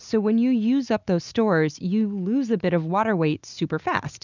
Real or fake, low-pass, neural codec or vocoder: real; 7.2 kHz; none